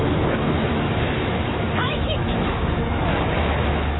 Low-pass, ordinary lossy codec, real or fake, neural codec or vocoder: 7.2 kHz; AAC, 16 kbps; fake; autoencoder, 48 kHz, 128 numbers a frame, DAC-VAE, trained on Japanese speech